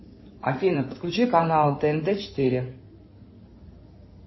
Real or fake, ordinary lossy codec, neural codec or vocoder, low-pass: fake; MP3, 24 kbps; codec, 16 kHz in and 24 kHz out, 2.2 kbps, FireRedTTS-2 codec; 7.2 kHz